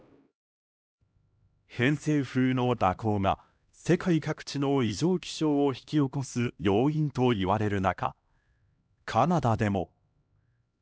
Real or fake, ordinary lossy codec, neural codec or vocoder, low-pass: fake; none; codec, 16 kHz, 1 kbps, X-Codec, HuBERT features, trained on LibriSpeech; none